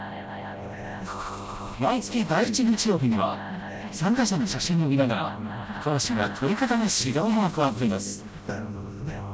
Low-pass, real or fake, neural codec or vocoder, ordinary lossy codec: none; fake; codec, 16 kHz, 0.5 kbps, FreqCodec, smaller model; none